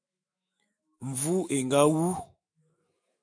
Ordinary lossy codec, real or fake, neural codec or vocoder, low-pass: MP3, 48 kbps; fake; autoencoder, 48 kHz, 128 numbers a frame, DAC-VAE, trained on Japanese speech; 9.9 kHz